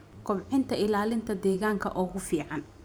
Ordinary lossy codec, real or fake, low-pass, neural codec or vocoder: none; fake; none; vocoder, 44.1 kHz, 128 mel bands every 512 samples, BigVGAN v2